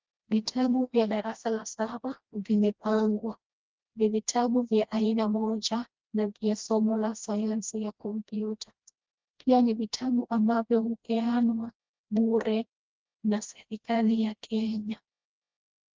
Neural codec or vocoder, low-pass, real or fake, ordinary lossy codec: codec, 16 kHz, 1 kbps, FreqCodec, smaller model; 7.2 kHz; fake; Opus, 32 kbps